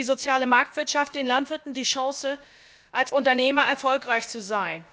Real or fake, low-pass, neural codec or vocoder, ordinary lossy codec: fake; none; codec, 16 kHz, about 1 kbps, DyCAST, with the encoder's durations; none